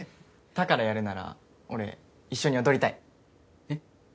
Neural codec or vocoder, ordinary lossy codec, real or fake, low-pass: none; none; real; none